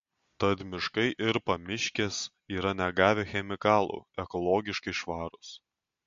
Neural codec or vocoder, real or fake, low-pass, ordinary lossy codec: none; real; 7.2 kHz; MP3, 48 kbps